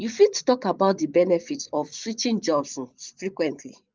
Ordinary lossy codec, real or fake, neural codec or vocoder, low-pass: Opus, 32 kbps; fake; vocoder, 24 kHz, 100 mel bands, Vocos; 7.2 kHz